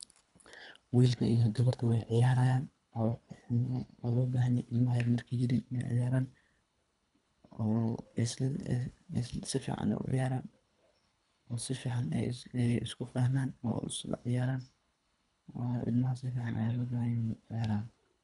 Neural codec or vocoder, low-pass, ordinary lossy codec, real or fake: codec, 24 kHz, 3 kbps, HILCodec; 10.8 kHz; none; fake